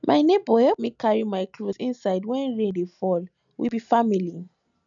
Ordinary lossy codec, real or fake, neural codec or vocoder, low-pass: none; real; none; 7.2 kHz